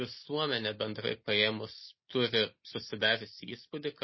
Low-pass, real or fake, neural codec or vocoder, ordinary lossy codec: 7.2 kHz; real; none; MP3, 24 kbps